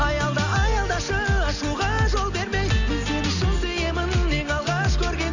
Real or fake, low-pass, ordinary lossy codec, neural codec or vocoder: real; 7.2 kHz; none; none